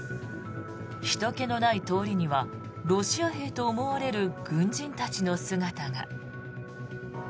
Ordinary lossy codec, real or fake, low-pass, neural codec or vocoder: none; real; none; none